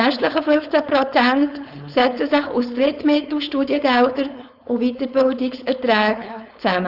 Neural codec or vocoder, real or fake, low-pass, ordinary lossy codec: codec, 16 kHz, 4.8 kbps, FACodec; fake; 5.4 kHz; none